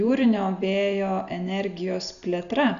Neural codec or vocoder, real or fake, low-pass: none; real; 7.2 kHz